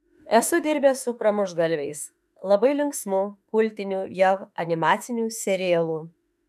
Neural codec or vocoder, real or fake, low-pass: autoencoder, 48 kHz, 32 numbers a frame, DAC-VAE, trained on Japanese speech; fake; 14.4 kHz